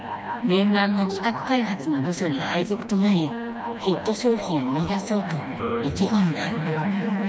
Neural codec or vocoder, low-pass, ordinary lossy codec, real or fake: codec, 16 kHz, 1 kbps, FreqCodec, smaller model; none; none; fake